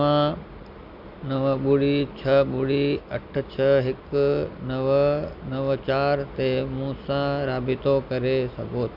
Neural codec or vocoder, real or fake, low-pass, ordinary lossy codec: none; real; 5.4 kHz; none